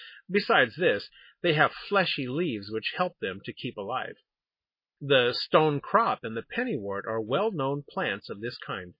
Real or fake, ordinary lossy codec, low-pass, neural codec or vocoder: real; MP3, 24 kbps; 5.4 kHz; none